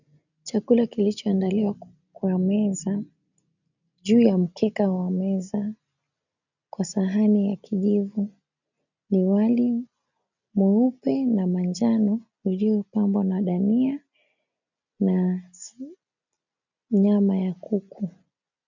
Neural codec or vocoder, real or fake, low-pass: none; real; 7.2 kHz